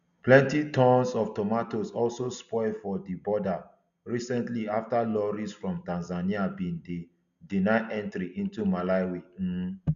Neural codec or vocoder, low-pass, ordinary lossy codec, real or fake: none; 7.2 kHz; none; real